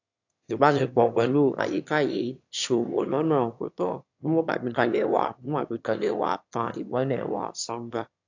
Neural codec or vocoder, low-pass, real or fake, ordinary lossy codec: autoencoder, 22.05 kHz, a latent of 192 numbers a frame, VITS, trained on one speaker; 7.2 kHz; fake; AAC, 48 kbps